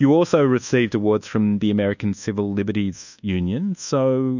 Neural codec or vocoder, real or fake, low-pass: codec, 24 kHz, 1.2 kbps, DualCodec; fake; 7.2 kHz